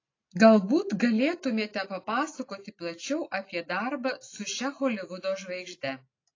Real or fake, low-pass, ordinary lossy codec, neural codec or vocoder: real; 7.2 kHz; AAC, 32 kbps; none